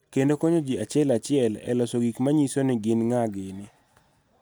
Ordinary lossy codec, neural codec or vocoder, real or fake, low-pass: none; none; real; none